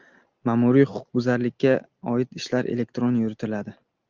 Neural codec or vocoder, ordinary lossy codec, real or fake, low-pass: none; Opus, 32 kbps; real; 7.2 kHz